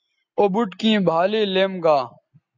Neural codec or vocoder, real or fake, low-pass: none; real; 7.2 kHz